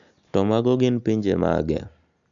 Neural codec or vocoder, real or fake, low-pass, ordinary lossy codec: none; real; 7.2 kHz; none